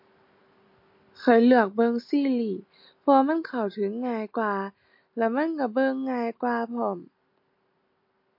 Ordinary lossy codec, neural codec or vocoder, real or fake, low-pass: MP3, 32 kbps; none; real; 5.4 kHz